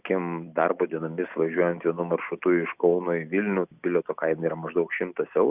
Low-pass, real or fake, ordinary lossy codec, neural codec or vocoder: 3.6 kHz; real; Opus, 64 kbps; none